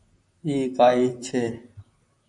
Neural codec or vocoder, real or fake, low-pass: vocoder, 44.1 kHz, 128 mel bands, Pupu-Vocoder; fake; 10.8 kHz